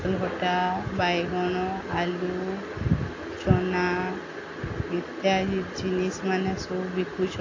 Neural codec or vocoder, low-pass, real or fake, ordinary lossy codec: none; 7.2 kHz; real; AAC, 32 kbps